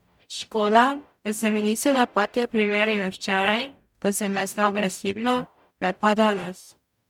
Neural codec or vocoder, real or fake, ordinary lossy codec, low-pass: codec, 44.1 kHz, 0.9 kbps, DAC; fake; MP3, 96 kbps; 19.8 kHz